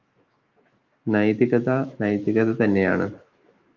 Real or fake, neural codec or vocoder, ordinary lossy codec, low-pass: fake; autoencoder, 48 kHz, 128 numbers a frame, DAC-VAE, trained on Japanese speech; Opus, 24 kbps; 7.2 kHz